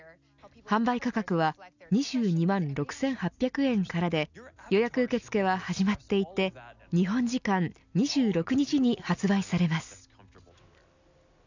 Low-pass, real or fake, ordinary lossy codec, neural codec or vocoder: 7.2 kHz; real; none; none